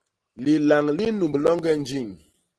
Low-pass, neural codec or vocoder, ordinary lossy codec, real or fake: 10.8 kHz; none; Opus, 16 kbps; real